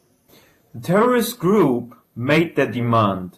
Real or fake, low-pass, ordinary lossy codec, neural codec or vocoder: fake; 14.4 kHz; AAC, 48 kbps; vocoder, 48 kHz, 128 mel bands, Vocos